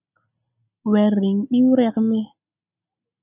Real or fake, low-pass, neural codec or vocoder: real; 3.6 kHz; none